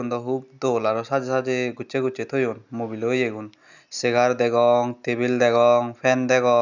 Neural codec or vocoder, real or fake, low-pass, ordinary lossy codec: none; real; 7.2 kHz; none